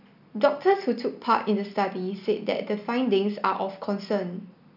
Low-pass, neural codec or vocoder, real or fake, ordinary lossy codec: 5.4 kHz; none; real; none